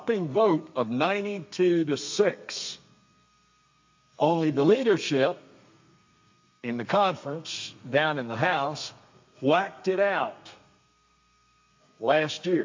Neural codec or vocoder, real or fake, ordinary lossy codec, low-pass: codec, 32 kHz, 1.9 kbps, SNAC; fake; MP3, 48 kbps; 7.2 kHz